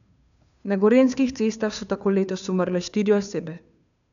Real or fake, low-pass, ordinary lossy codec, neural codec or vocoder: fake; 7.2 kHz; none; codec, 16 kHz, 2 kbps, FunCodec, trained on Chinese and English, 25 frames a second